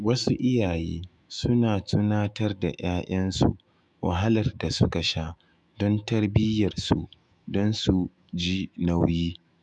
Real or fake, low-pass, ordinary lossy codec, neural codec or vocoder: fake; 10.8 kHz; none; autoencoder, 48 kHz, 128 numbers a frame, DAC-VAE, trained on Japanese speech